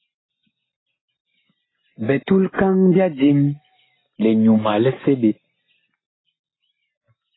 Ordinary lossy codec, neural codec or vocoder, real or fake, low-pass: AAC, 16 kbps; none; real; 7.2 kHz